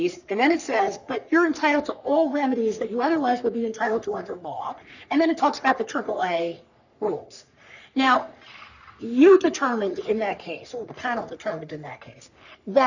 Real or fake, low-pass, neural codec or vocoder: fake; 7.2 kHz; codec, 44.1 kHz, 3.4 kbps, Pupu-Codec